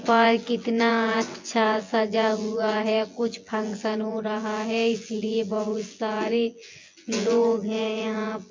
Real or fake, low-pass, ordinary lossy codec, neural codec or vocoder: fake; 7.2 kHz; MP3, 48 kbps; vocoder, 24 kHz, 100 mel bands, Vocos